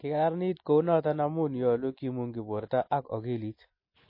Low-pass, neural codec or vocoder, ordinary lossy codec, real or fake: 5.4 kHz; none; MP3, 24 kbps; real